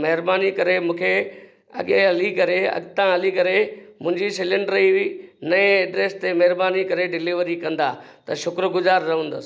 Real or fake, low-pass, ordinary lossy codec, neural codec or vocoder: real; none; none; none